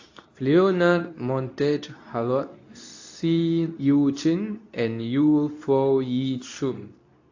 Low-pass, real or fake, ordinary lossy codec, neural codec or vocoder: 7.2 kHz; fake; AAC, 48 kbps; codec, 24 kHz, 0.9 kbps, WavTokenizer, medium speech release version 2